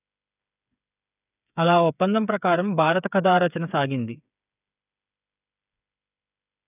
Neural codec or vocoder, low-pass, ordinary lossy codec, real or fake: codec, 16 kHz, 8 kbps, FreqCodec, smaller model; 3.6 kHz; none; fake